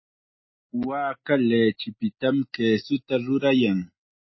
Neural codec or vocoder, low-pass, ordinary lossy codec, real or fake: none; 7.2 kHz; MP3, 24 kbps; real